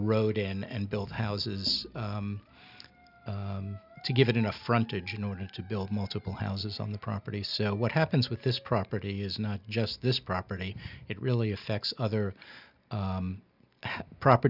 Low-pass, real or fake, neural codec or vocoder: 5.4 kHz; real; none